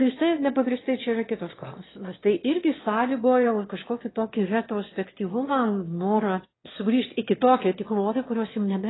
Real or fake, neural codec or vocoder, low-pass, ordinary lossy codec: fake; autoencoder, 22.05 kHz, a latent of 192 numbers a frame, VITS, trained on one speaker; 7.2 kHz; AAC, 16 kbps